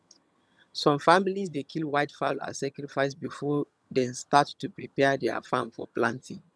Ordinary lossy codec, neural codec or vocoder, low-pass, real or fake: none; vocoder, 22.05 kHz, 80 mel bands, HiFi-GAN; none; fake